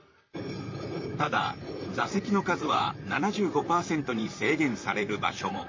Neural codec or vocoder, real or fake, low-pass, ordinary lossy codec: vocoder, 44.1 kHz, 128 mel bands, Pupu-Vocoder; fake; 7.2 kHz; MP3, 32 kbps